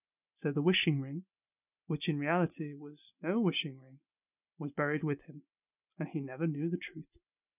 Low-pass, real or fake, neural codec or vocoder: 3.6 kHz; real; none